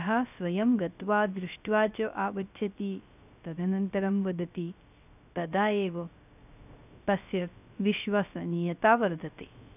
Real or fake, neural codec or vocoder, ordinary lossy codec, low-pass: fake; codec, 16 kHz, 0.3 kbps, FocalCodec; none; 3.6 kHz